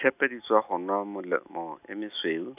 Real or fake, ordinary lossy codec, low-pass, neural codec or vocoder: real; none; 3.6 kHz; none